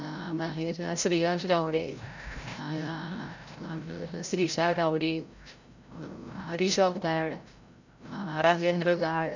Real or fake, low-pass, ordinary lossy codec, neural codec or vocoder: fake; 7.2 kHz; none; codec, 16 kHz, 0.5 kbps, FreqCodec, larger model